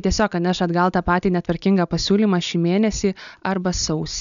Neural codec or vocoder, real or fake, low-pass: none; real; 7.2 kHz